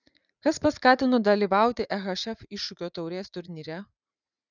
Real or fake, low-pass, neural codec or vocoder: fake; 7.2 kHz; vocoder, 44.1 kHz, 80 mel bands, Vocos